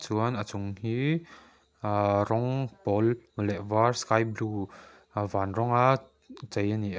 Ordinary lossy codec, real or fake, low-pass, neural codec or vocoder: none; real; none; none